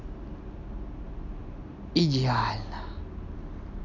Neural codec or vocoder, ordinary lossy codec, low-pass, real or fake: none; none; 7.2 kHz; real